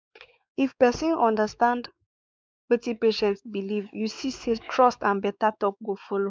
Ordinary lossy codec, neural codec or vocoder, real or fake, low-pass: none; codec, 16 kHz, 4 kbps, X-Codec, WavLM features, trained on Multilingual LibriSpeech; fake; none